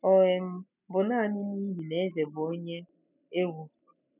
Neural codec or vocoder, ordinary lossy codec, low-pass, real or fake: none; none; 3.6 kHz; real